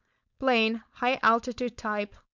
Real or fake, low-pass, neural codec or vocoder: fake; 7.2 kHz; codec, 16 kHz, 4.8 kbps, FACodec